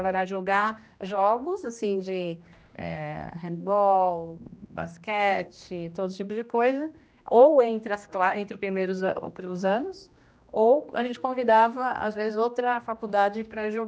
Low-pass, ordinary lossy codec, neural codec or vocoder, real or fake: none; none; codec, 16 kHz, 1 kbps, X-Codec, HuBERT features, trained on general audio; fake